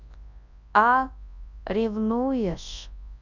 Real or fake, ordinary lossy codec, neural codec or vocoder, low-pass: fake; none; codec, 24 kHz, 0.9 kbps, WavTokenizer, large speech release; 7.2 kHz